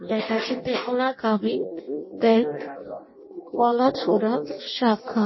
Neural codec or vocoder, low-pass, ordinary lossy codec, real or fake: codec, 16 kHz in and 24 kHz out, 0.6 kbps, FireRedTTS-2 codec; 7.2 kHz; MP3, 24 kbps; fake